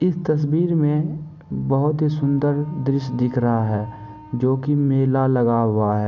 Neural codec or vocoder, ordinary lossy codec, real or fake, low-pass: none; none; real; 7.2 kHz